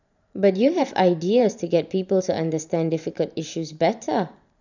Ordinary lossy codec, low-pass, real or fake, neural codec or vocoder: none; 7.2 kHz; fake; vocoder, 44.1 kHz, 128 mel bands every 512 samples, BigVGAN v2